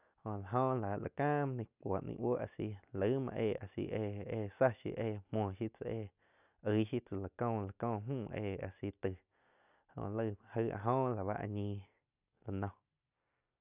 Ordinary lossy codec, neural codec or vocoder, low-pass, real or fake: none; none; 3.6 kHz; real